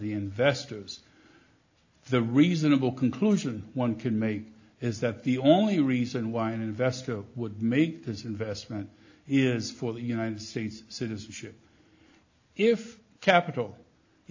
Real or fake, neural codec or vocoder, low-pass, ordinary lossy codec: real; none; 7.2 kHz; AAC, 48 kbps